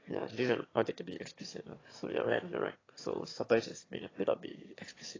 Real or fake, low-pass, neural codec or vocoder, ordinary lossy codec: fake; 7.2 kHz; autoencoder, 22.05 kHz, a latent of 192 numbers a frame, VITS, trained on one speaker; AAC, 32 kbps